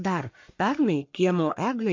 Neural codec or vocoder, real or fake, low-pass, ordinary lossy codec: codec, 44.1 kHz, 1.7 kbps, Pupu-Codec; fake; 7.2 kHz; MP3, 48 kbps